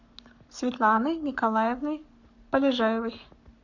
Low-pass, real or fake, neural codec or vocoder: 7.2 kHz; fake; codec, 44.1 kHz, 7.8 kbps, Pupu-Codec